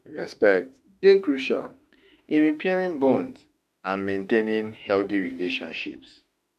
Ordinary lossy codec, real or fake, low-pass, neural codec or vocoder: none; fake; 14.4 kHz; autoencoder, 48 kHz, 32 numbers a frame, DAC-VAE, trained on Japanese speech